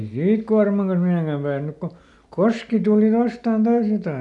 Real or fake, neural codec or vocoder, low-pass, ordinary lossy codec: real; none; 10.8 kHz; none